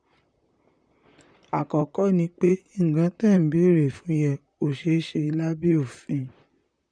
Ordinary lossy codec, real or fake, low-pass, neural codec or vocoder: none; fake; 9.9 kHz; vocoder, 44.1 kHz, 128 mel bands, Pupu-Vocoder